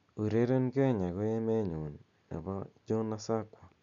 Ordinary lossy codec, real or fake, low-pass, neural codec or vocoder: MP3, 64 kbps; real; 7.2 kHz; none